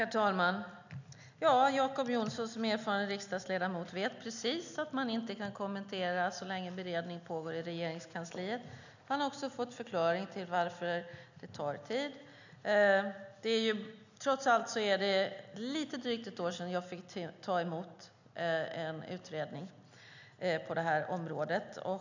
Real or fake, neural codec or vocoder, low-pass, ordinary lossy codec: real; none; 7.2 kHz; none